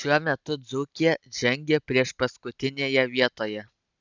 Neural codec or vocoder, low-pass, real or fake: none; 7.2 kHz; real